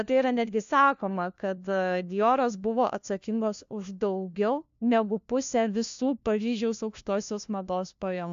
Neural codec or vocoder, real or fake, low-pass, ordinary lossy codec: codec, 16 kHz, 1 kbps, FunCodec, trained on LibriTTS, 50 frames a second; fake; 7.2 kHz; MP3, 64 kbps